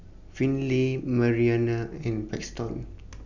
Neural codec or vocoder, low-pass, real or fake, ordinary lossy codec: none; 7.2 kHz; real; none